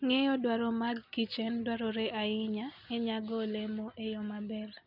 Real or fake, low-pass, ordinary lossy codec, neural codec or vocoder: real; 5.4 kHz; none; none